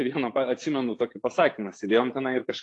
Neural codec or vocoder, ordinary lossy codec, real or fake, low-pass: vocoder, 24 kHz, 100 mel bands, Vocos; AAC, 48 kbps; fake; 10.8 kHz